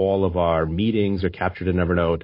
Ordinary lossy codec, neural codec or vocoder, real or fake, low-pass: MP3, 24 kbps; none; real; 5.4 kHz